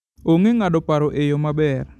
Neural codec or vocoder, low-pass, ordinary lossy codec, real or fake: none; none; none; real